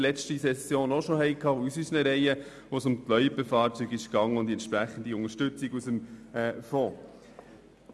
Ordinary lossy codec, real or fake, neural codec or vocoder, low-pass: none; real; none; none